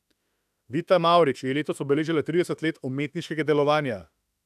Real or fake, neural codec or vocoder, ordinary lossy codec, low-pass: fake; autoencoder, 48 kHz, 32 numbers a frame, DAC-VAE, trained on Japanese speech; none; 14.4 kHz